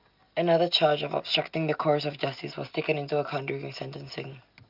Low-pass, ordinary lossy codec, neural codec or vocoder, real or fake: 5.4 kHz; Opus, 24 kbps; none; real